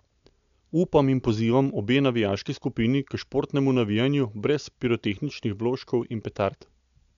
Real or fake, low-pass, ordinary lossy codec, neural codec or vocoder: real; 7.2 kHz; MP3, 96 kbps; none